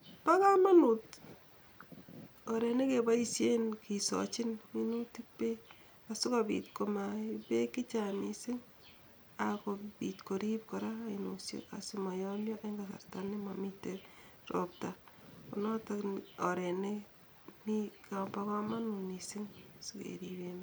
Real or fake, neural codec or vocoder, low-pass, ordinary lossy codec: real; none; none; none